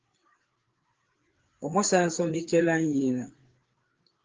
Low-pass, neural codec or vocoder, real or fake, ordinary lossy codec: 7.2 kHz; codec, 16 kHz, 4 kbps, FreqCodec, larger model; fake; Opus, 16 kbps